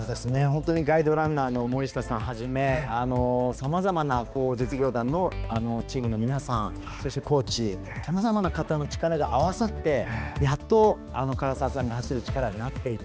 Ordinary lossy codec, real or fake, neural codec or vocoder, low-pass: none; fake; codec, 16 kHz, 2 kbps, X-Codec, HuBERT features, trained on balanced general audio; none